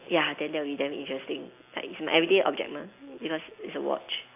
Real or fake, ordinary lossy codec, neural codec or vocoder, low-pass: real; AAC, 24 kbps; none; 3.6 kHz